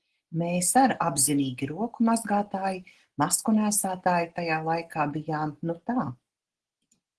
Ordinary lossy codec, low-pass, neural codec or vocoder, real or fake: Opus, 16 kbps; 10.8 kHz; none; real